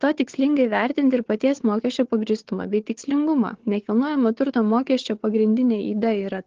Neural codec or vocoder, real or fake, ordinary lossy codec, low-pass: codec, 16 kHz, 4 kbps, FunCodec, trained on Chinese and English, 50 frames a second; fake; Opus, 16 kbps; 7.2 kHz